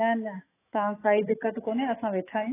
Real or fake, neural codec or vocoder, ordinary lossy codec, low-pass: fake; autoencoder, 48 kHz, 128 numbers a frame, DAC-VAE, trained on Japanese speech; AAC, 16 kbps; 3.6 kHz